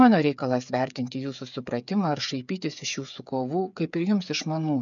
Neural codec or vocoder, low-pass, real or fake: codec, 16 kHz, 8 kbps, FreqCodec, smaller model; 7.2 kHz; fake